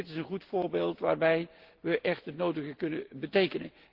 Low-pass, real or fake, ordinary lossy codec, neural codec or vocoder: 5.4 kHz; real; Opus, 16 kbps; none